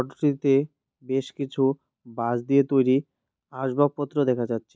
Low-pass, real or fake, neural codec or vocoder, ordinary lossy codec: none; real; none; none